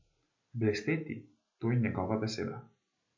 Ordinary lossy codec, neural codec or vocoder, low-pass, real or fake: MP3, 48 kbps; none; 7.2 kHz; real